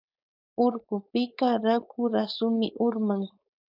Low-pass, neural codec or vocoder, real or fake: 5.4 kHz; codec, 16 kHz, 4.8 kbps, FACodec; fake